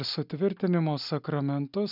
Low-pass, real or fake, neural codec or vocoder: 5.4 kHz; real; none